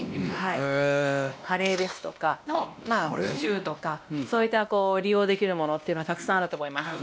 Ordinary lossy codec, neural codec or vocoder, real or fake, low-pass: none; codec, 16 kHz, 1 kbps, X-Codec, WavLM features, trained on Multilingual LibriSpeech; fake; none